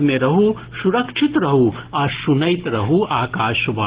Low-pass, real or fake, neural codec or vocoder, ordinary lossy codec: 3.6 kHz; real; none; Opus, 16 kbps